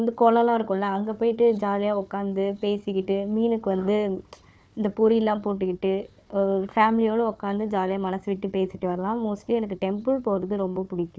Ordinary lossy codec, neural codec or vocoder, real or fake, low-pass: none; codec, 16 kHz, 4 kbps, FunCodec, trained on Chinese and English, 50 frames a second; fake; none